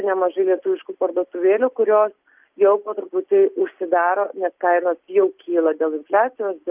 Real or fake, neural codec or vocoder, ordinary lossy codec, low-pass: real; none; Opus, 24 kbps; 3.6 kHz